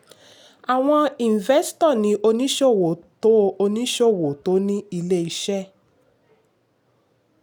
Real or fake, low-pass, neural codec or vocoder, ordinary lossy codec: real; none; none; none